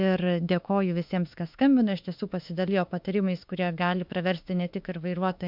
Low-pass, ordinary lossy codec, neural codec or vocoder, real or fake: 5.4 kHz; MP3, 48 kbps; autoencoder, 48 kHz, 32 numbers a frame, DAC-VAE, trained on Japanese speech; fake